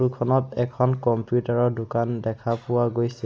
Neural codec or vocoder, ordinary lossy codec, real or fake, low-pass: none; none; real; none